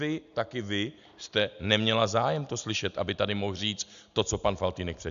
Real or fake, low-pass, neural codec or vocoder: real; 7.2 kHz; none